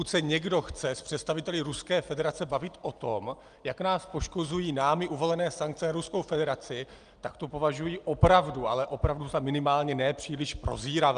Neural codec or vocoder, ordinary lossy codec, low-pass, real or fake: none; Opus, 32 kbps; 9.9 kHz; real